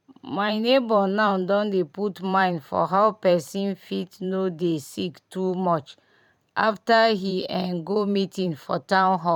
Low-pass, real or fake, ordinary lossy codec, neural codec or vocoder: 19.8 kHz; fake; none; vocoder, 44.1 kHz, 128 mel bands every 512 samples, BigVGAN v2